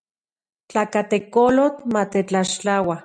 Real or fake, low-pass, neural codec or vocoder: real; 9.9 kHz; none